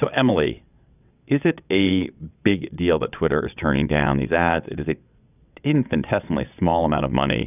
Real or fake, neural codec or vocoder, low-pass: fake; vocoder, 22.05 kHz, 80 mel bands, WaveNeXt; 3.6 kHz